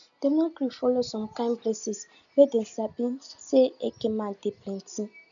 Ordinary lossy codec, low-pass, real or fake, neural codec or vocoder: none; 7.2 kHz; real; none